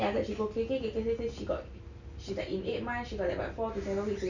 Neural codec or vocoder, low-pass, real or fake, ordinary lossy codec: none; 7.2 kHz; real; none